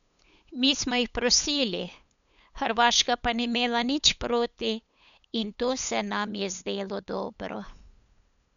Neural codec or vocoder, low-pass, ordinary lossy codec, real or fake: codec, 16 kHz, 8 kbps, FunCodec, trained on LibriTTS, 25 frames a second; 7.2 kHz; none; fake